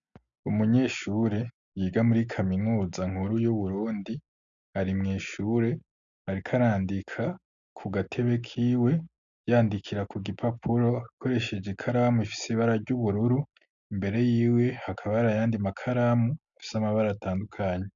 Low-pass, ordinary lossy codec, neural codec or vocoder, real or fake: 7.2 kHz; MP3, 96 kbps; none; real